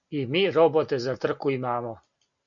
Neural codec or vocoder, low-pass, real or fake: none; 7.2 kHz; real